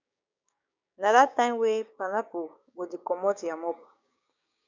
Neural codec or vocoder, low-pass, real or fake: codec, 16 kHz, 6 kbps, DAC; 7.2 kHz; fake